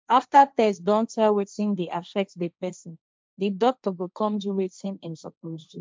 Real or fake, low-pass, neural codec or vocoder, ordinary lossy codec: fake; none; codec, 16 kHz, 1.1 kbps, Voila-Tokenizer; none